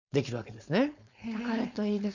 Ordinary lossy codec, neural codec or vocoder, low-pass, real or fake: none; codec, 16 kHz, 4.8 kbps, FACodec; 7.2 kHz; fake